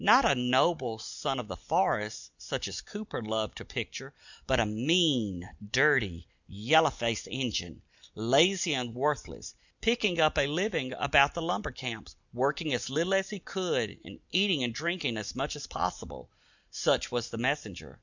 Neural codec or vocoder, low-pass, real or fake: none; 7.2 kHz; real